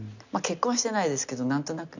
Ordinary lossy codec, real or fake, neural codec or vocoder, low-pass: none; real; none; 7.2 kHz